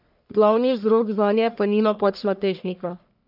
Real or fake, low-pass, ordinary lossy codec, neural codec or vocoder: fake; 5.4 kHz; none; codec, 44.1 kHz, 1.7 kbps, Pupu-Codec